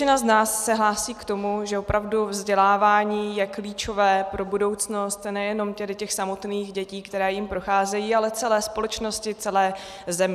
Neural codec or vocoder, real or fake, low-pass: none; real; 14.4 kHz